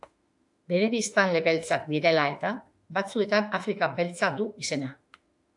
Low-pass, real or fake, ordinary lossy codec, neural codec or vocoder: 10.8 kHz; fake; AAC, 64 kbps; autoencoder, 48 kHz, 32 numbers a frame, DAC-VAE, trained on Japanese speech